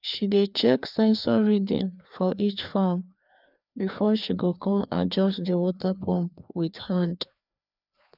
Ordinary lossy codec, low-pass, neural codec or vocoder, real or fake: none; 5.4 kHz; codec, 16 kHz, 2 kbps, FreqCodec, larger model; fake